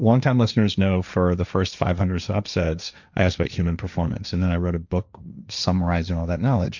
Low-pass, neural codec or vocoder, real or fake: 7.2 kHz; codec, 16 kHz, 1.1 kbps, Voila-Tokenizer; fake